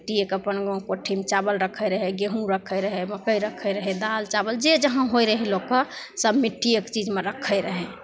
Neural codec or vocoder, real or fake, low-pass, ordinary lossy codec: none; real; none; none